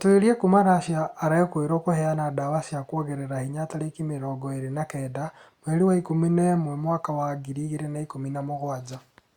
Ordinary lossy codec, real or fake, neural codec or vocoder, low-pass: Opus, 64 kbps; real; none; 19.8 kHz